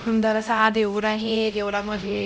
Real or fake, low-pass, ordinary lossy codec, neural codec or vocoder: fake; none; none; codec, 16 kHz, 0.5 kbps, X-Codec, HuBERT features, trained on LibriSpeech